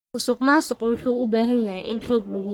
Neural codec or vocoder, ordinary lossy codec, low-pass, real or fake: codec, 44.1 kHz, 1.7 kbps, Pupu-Codec; none; none; fake